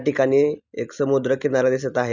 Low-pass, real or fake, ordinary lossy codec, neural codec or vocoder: 7.2 kHz; real; none; none